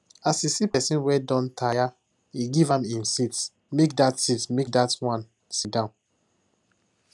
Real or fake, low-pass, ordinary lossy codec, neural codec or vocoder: real; 10.8 kHz; none; none